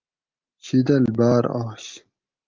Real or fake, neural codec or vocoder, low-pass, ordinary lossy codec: real; none; 7.2 kHz; Opus, 32 kbps